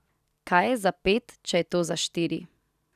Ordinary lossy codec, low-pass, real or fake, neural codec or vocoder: none; 14.4 kHz; real; none